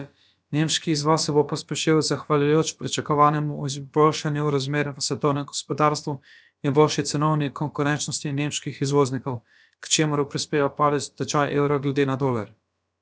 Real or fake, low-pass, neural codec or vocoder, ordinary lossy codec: fake; none; codec, 16 kHz, about 1 kbps, DyCAST, with the encoder's durations; none